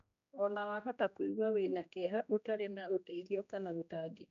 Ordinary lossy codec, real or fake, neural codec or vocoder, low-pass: none; fake; codec, 16 kHz, 1 kbps, X-Codec, HuBERT features, trained on general audio; 7.2 kHz